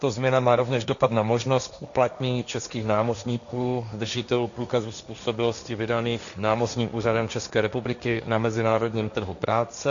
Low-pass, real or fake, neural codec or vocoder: 7.2 kHz; fake; codec, 16 kHz, 1.1 kbps, Voila-Tokenizer